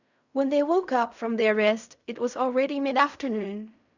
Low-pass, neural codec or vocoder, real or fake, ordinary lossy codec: 7.2 kHz; codec, 16 kHz in and 24 kHz out, 0.4 kbps, LongCat-Audio-Codec, fine tuned four codebook decoder; fake; none